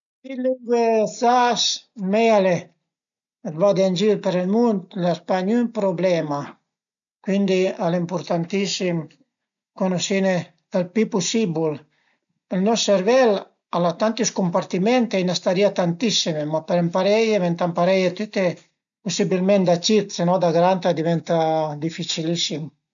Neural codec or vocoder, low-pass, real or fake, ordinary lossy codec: none; 7.2 kHz; real; MP3, 96 kbps